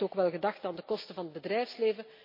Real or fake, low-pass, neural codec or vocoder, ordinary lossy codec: real; 5.4 kHz; none; MP3, 32 kbps